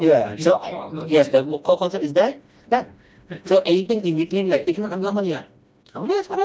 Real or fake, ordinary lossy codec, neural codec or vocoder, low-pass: fake; none; codec, 16 kHz, 1 kbps, FreqCodec, smaller model; none